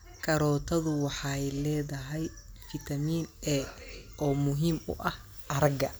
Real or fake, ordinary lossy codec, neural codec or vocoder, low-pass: real; none; none; none